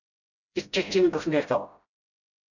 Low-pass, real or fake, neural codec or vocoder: 7.2 kHz; fake; codec, 16 kHz, 0.5 kbps, FreqCodec, smaller model